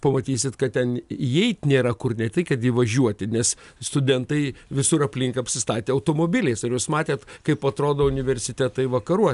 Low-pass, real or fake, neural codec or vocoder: 10.8 kHz; real; none